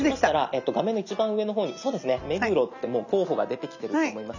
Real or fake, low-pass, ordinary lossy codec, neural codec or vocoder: real; 7.2 kHz; none; none